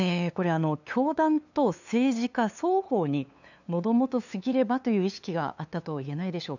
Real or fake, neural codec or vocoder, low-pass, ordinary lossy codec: fake; codec, 16 kHz, 2 kbps, FunCodec, trained on LibriTTS, 25 frames a second; 7.2 kHz; none